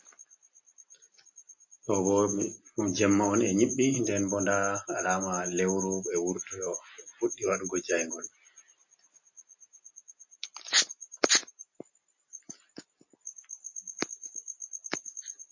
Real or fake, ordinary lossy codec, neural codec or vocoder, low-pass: real; MP3, 32 kbps; none; 7.2 kHz